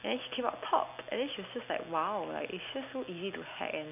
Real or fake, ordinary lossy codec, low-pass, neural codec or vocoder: real; none; 3.6 kHz; none